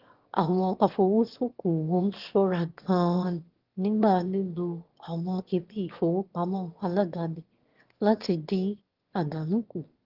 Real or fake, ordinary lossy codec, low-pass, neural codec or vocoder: fake; Opus, 16 kbps; 5.4 kHz; autoencoder, 22.05 kHz, a latent of 192 numbers a frame, VITS, trained on one speaker